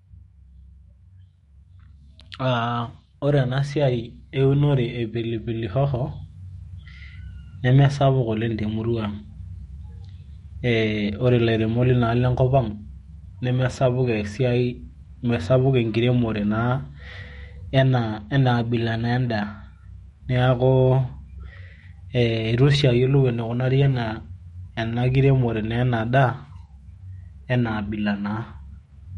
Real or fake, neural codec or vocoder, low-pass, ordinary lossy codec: fake; autoencoder, 48 kHz, 128 numbers a frame, DAC-VAE, trained on Japanese speech; 19.8 kHz; MP3, 48 kbps